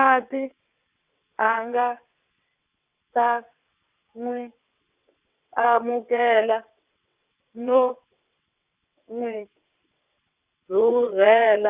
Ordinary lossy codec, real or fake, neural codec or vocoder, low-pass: Opus, 64 kbps; fake; vocoder, 22.05 kHz, 80 mel bands, WaveNeXt; 3.6 kHz